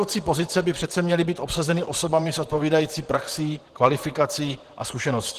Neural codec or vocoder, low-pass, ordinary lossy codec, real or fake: vocoder, 44.1 kHz, 128 mel bands, Pupu-Vocoder; 14.4 kHz; Opus, 16 kbps; fake